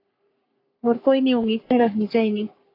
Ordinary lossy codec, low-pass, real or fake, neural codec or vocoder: AAC, 32 kbps; 5.4 kHz; fake; codec, 44.1 kHz, 3.4 kbps, Pupu-Codec